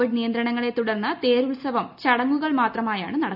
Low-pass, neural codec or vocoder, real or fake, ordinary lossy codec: 5.4 kHz; none; real; none